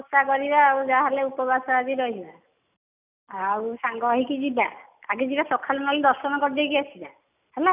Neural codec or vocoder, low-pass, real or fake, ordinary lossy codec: none; 3.6 kHz; real; none